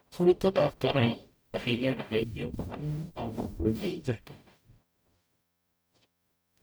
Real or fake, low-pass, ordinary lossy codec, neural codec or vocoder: fake; none; none; codec, 44.1 kHz, 0.9 kbps, DAC